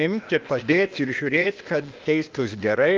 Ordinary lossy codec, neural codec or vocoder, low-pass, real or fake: Opus, 24 kbps; codec, 16 kHz, 0.8 kbps, ZipCodec; 7.2 kHz; fake